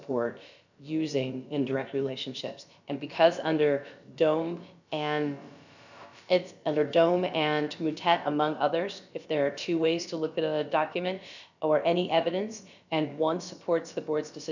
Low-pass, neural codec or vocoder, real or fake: 7.2 kHz; codec, 16 kHz, about 1 kbps, DyCAST, with the encoder's durations; fake